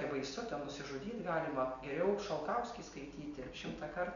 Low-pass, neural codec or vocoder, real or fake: 7.2 kHz; none; real